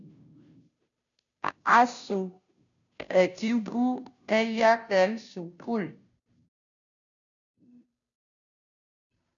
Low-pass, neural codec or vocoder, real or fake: 7.2 kHz; codec, 16 kHz, 0.5 kbps, FunCodec, trained on Chinese and English, 25 frames a second; fake